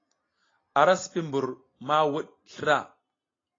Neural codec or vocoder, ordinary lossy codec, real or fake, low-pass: none; AAC, 32 kbps; real; 7.2 kHz